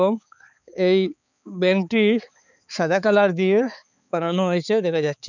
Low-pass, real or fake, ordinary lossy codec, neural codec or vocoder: 7.2 kHz; fake; none; codec, 16 kHz, 4 kbps, X-Codec, HuBERT features, trained on balanced general audio